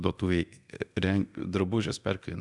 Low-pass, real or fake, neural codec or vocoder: 10.8 kHz; fake; codec, 24 kHz, 0.9 kbps, DualCodec